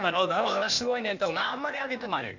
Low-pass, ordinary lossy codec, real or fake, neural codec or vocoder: 7.2 kHz; none; fake; codec, 16 kHz, 0.8 kbps, ZipCodec